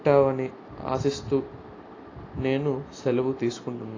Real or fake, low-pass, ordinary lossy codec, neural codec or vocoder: real; 7.2 kHz; AAC, 32 kbps; none